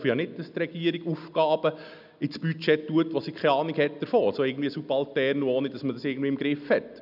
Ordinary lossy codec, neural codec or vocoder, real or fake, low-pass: none; none; real; 5.4 kHz